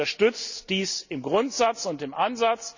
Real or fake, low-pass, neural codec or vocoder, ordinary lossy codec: real; 7.2 kHz; none; none